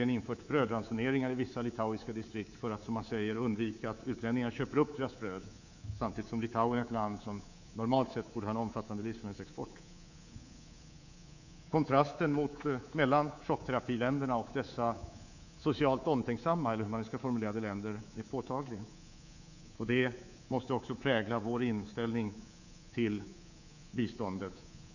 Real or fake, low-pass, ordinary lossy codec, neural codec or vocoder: fake; 7.2 kHz; none; codec, 24 kHz, 3.1 kbps, DualCodec